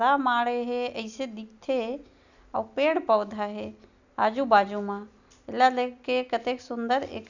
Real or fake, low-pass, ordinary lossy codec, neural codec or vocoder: real; 7.2 kHz; none; none